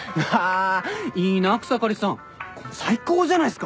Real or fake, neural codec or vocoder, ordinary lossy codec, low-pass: real; none; none; none